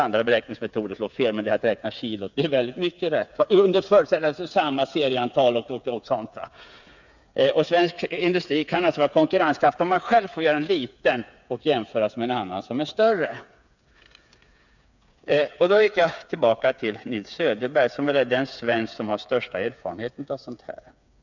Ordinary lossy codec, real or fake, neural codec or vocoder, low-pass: none; fake; codec, 16 kHz, 8 kbps, FreqCodec, smaller model; 7.2 kHz